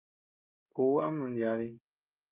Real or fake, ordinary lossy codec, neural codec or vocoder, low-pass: fake; Opus, 24 kbps; codec, 16 kHz, 16 kbps, FreqCodec, smaller model; 3.6 kHz